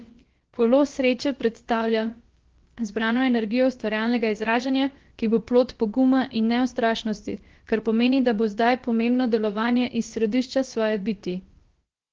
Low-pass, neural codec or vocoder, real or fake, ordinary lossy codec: 7.2 kHz; codec, 16 kHz, about 1 kbps, DyCAST, with the encoder's durations; fake; Opus, 16 kbps